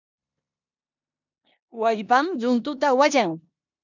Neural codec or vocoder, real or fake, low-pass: codec, 16 kHz in and 24 kHz out, 0.9 kbps, LongCat-Audio-Codec, four codebook decoder; fake; 7.2 kHz